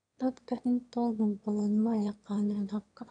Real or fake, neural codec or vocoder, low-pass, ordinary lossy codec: fake; autoencoder, 22.05 kHz, a latent of 192 numbers a frame, VITS, trained on one speaker; 9.9 kHz; none